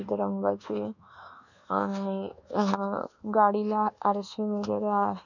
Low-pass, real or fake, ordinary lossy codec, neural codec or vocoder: 7.2 kHz; fake; none; codec, 24 kHz, 1.2 kbps, DualCodec